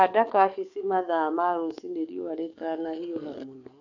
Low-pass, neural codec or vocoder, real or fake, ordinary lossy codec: 7.2 kHz; codec, 44.1 kHz, 7.8 kbps, DAC; fake; none